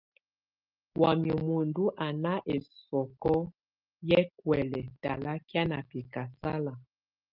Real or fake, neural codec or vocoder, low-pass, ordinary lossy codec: real; none; 5.4 kHz; Opus, 32 kbps